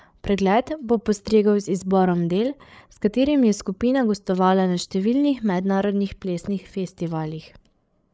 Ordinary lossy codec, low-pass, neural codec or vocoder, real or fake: none; none; codec, 16 kHz, 8 kbps, FreqCodec, larger model; fake